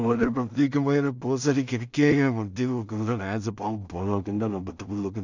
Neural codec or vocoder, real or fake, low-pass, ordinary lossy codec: codec, 16 kHz in and 24 kHz out, 0.4 kbps, LongCat-Audio-Codec, two codebook decoder; fake; 7.2 kHz; none